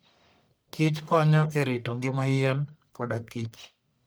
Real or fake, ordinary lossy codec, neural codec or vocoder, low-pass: fake; none; codec, 44.1 kHz, 1.7 kbps, Pupu-Codec; none